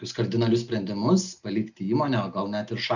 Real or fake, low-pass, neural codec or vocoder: real; 7.2 kHz; none